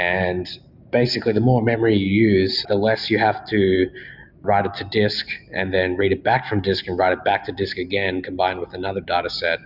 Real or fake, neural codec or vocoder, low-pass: real; none; 5.4 kHz